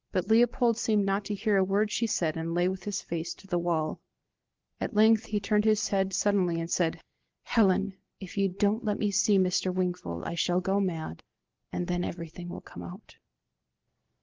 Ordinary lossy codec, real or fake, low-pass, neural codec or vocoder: Opus, 16 kbps; real; 7.2 kHz; none